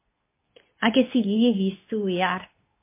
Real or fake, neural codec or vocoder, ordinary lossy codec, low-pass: fake; codec, 24 kHz, 0.9 kbps, WavTokenizer, medium speech release version 2; MP3, 24 kbps; 3.6 kHz